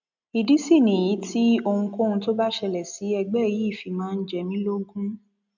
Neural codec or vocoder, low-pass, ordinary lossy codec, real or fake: none; 7.2 kHz; none; real